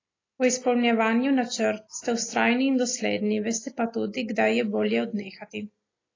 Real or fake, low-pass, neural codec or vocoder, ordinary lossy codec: real; 7.2 kHz; none; AAC, 32 kbps